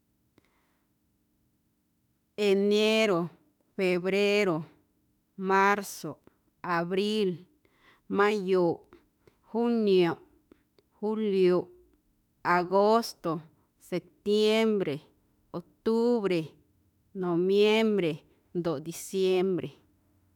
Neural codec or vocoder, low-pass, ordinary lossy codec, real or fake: autoencoder, 48 kHz, 32 numbers a frame, DAC-VAE, trained on Japanese speech; 19.8 kHz; none; fake